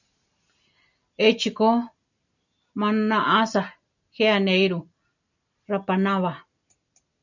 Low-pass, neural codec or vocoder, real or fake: 7.2 kHz; none; real